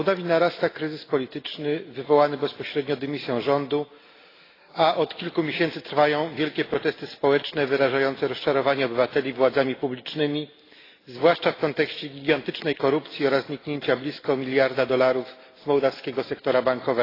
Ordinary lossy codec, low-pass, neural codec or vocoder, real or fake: AAC, 24 kbps; 5.4 kHz; none; real